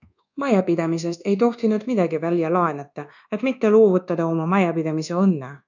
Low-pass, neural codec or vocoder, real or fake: 7.2 kHz; codec, 24 kHz, 1.2 kbps, DualCodec; fake